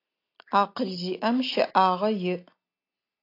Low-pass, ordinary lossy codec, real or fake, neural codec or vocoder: 5.4 kHz; AAC, 32 kbps; real; none